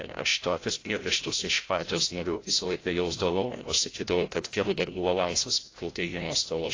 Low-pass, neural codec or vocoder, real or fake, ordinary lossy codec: 7.2 kHz; codec, 16 kHz, 0.5 kbps, FreqCodec, larger model; fake; AAC, 32 kbps